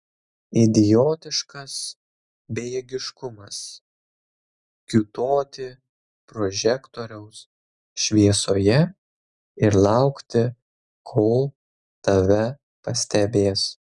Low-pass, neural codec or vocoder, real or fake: 10.8 kHz; none; real